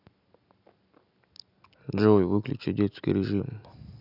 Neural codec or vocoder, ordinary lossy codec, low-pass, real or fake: none; none; 5.4 kHz; real